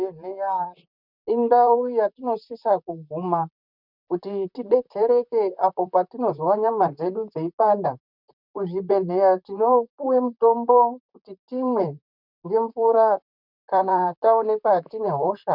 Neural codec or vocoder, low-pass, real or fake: vocoder, 44.1 kHz, 128 mel bands, Pupu-Vocoder; 5.4 kHz; fake